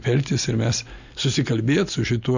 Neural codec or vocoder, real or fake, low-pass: none; real; 7.2 kHz